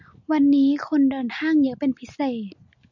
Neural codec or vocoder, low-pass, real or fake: none; 7.2 kHz; real